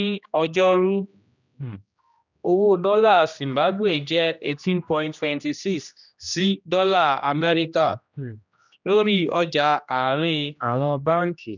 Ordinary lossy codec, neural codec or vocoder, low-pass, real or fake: none; codec, 16 kHz, 1 kbps, X-Codec, HuBERT features, trained on general audio; 7.2 kHz; fake